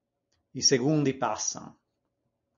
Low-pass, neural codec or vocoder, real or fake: 7.2 kHz; none; real